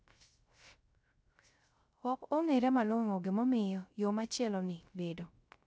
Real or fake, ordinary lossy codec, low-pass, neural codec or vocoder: fake; none; none; codec, 16 kHz, 0.3 kbps, FocalCodec